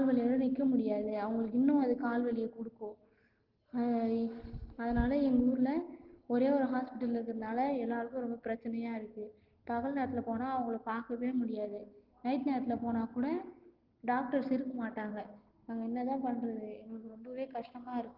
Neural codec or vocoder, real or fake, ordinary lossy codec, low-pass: none; real; Opus, 16 kbps; 5.4 kHz